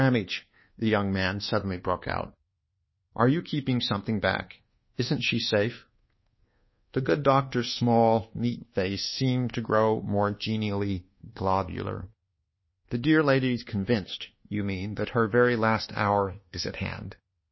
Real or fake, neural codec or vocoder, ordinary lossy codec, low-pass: fake; codec, 24 kHz, 1.2 kbps, DualCodec; MP3, 24 kbps; 7.2 kHz